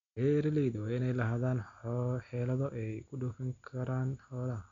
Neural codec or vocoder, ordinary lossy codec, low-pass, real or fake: none; MP3, 96 kbps; 7.2 kHz; real